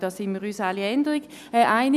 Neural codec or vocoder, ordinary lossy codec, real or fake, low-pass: none; none; real; 14.4 kHz